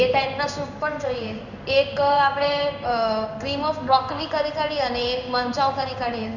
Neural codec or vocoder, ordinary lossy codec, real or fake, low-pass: codec, 16 kHz in and 24 kHz out, 1 kbps, XY-Tokenizer; none; fake; 7.2 kHz